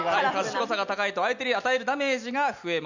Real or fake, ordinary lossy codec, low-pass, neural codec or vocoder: real; none; 7.2 kHz; none